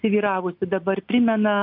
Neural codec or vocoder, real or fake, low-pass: none; real; 5.4 kHz